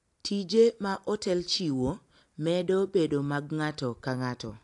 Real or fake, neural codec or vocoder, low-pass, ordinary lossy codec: real; none; 10.8 kHz; AAC, 64 kbps